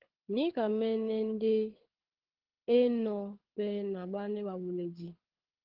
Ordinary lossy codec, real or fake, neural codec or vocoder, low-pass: Opus, 16 kbps; fake; codec, 24 kHz, 6 kbps, HILCodec; 5.4 kHz